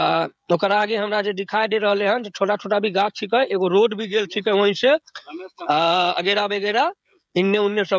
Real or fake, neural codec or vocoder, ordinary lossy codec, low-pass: fake; codec, 16 kHz, 16 kbps, FreqCodec, smaller model; none; none